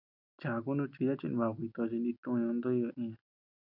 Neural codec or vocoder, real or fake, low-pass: none; real; 5.4 kHz